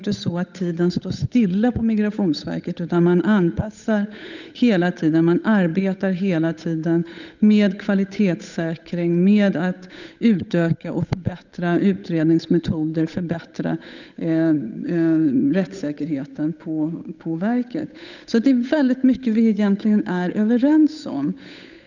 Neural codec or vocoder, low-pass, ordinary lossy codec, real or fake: codec, 16 kHz, 8 kbps, FunCodec, trained on Chinese and English, 25 frames a second; 7.2 kHz; none; fake